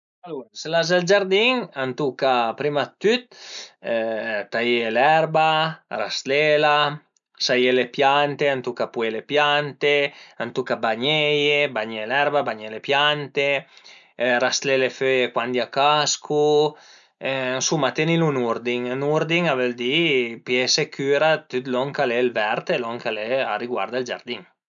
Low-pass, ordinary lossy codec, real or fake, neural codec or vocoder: 7.2 kHz; none; real; none